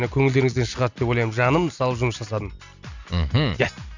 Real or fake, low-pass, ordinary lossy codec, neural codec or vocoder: real; 7.2 kHz; none; none